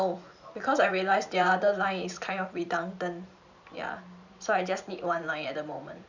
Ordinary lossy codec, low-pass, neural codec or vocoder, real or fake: none; 7.2 kHz; vocoder, 44.1 kHz, 128 mel bands every 512 samples, BigVGAN v2; fake